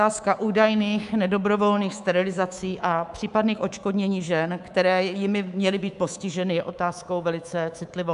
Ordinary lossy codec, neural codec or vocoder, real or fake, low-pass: AAC, 96 kbps; codec, 24 kHz, 3.1 kbps, DualCodec; fake; 10.8 kHz